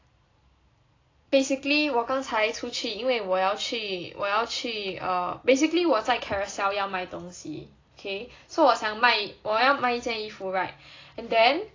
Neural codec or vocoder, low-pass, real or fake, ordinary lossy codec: none; 7.2 kHz; real; AAC, 32 kbps